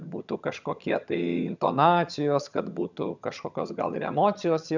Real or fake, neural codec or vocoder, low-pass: fake; vocoder, 22.05 kHz, 80 mel bands, HiFi-GAN; 7.2 kHz